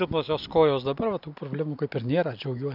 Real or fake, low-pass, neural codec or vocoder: real; 5.4 kHz; none